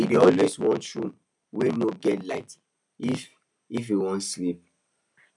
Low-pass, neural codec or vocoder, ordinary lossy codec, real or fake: 10.8 kHz; none; none; real